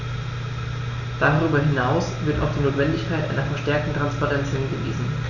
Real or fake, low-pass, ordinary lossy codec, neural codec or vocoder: real; 7.2 kHz; none; none